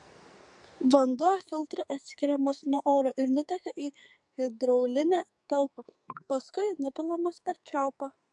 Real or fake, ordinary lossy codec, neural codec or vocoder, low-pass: fake; MP3, 64 kbps; codec, 44.1 kHz, 2.6 kbps, SNAC; 10.8 kHz